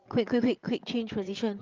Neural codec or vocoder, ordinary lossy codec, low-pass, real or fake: codec, 16 kHz, 8 kbps, FreqCodec, larger model; Opus, 24 kbps; 7.2 kHz; fake